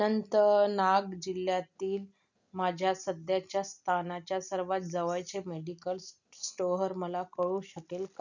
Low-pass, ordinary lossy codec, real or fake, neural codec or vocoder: 7.2 kHz; none; real; none